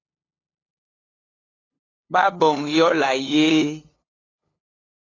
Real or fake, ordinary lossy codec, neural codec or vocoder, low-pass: fake; AAC, 32 kbps; codec, 16 kHz, 2 kbps, FunCodec, trained on LibriTTS, 25 frames a second; 7.2 kHz